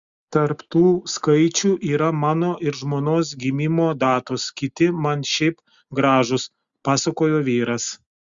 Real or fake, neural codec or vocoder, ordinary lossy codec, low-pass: real; none; Opus, 64 kbps; 7.2 kHz